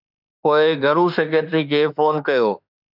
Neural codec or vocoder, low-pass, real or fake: autoencoder, 48 kHz, 32 numbers a frame, DAC-VAE, trained on Japanese speech; 5.4 kHz; fake